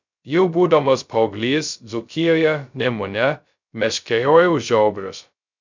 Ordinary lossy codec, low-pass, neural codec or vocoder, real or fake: MP3, 64 kbps; 7.2 kHz; codec, 16 kHz, 0.2 kbps, FocalCodec; fake